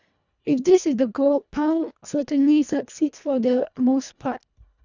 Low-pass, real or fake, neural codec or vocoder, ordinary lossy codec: 7.2 kHz; fake; codec, 24 kHz, 1.5 kbps, HILCodec; none